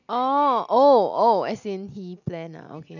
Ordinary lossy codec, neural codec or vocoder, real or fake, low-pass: none; none; real; 7.2 kHz